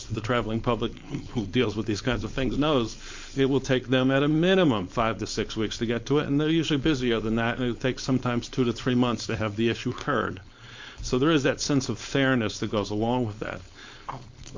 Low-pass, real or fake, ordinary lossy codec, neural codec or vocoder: 7.2 kHz; fake; MP3, 48 kbps; codec, 16 kHz, 4.8 kbps, FACodec